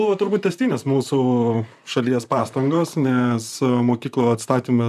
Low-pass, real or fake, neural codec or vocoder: 14.4 kHz; fake; vocoder, 44.1 kHz, 128 mel bands, Pupu-Vocoder